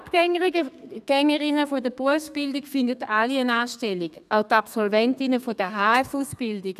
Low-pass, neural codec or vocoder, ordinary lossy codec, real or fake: 14.4 kHz; codec, 32 kHz, 1.9 kbps, SNAC; none; fake